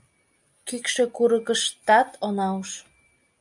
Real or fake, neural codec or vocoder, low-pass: real; none; 10.8 kHz